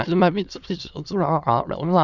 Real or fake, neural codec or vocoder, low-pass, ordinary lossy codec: fake; autoencoder, 22.05 kHz, a latent of 192 numbers a frame, VITS, trained on many speakers; 7.2 kHz; none